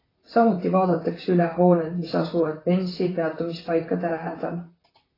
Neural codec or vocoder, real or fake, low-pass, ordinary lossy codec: vocoder, 44.1 kHz, 128 mel bands, Pupu-Vocoder; fake; 5.4 kHz; AAC, 24 kbps